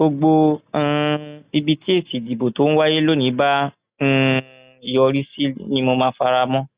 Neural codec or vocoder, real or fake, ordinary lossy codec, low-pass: none; real; Opus, 64 kbps; 3.6 kHz